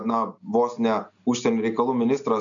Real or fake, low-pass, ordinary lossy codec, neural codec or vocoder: real; 7.2 kHz; MP3, 96 kbps; none